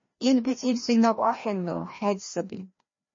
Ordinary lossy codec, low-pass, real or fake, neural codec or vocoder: MP3, 32 kbps; 7.2 kHz; fake; codec, 16 kHz, 1 kbps, FreqCodec, larger model